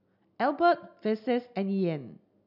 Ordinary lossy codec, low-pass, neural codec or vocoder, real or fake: none; 5.4 kHz; none; real